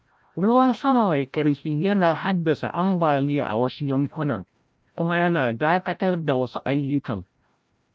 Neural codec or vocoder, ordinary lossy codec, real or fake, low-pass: codec, 16 kHz, 0.5 kbps, FreqCodec, larger model; none; fake; none